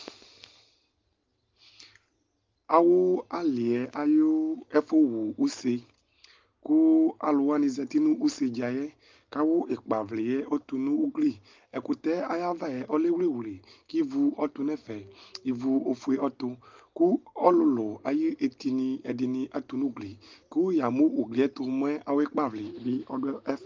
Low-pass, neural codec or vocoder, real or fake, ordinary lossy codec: 7.2 kHz; none; real; Opus, 32 kbps